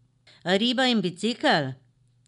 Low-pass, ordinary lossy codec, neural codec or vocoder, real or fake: 10.8 kHz; none; none; real